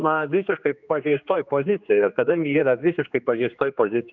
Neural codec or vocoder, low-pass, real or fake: codec, 16 kHz, 2 kbps, X-Codec, HuBERT features, trained on general audio; 7.2 kHz; fake